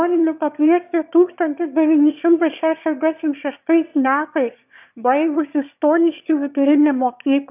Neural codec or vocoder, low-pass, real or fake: autoencoder, 22.05 kHz, a latent of 192 numbers a frame, VITS, trained on one speaker; 3.6 kHz; fake